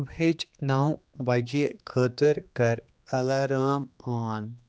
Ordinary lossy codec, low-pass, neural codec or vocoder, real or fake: none; none; codec, 16 kHz, 2 kbps, X-Codec, HuBERT features, trained on general audio; fake